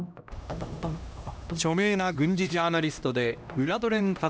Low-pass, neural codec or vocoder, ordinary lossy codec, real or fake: none; codec, 16 kHz, 1 kbps, X-Codec, HuBERT features, trained on LibriSpeech; none; fake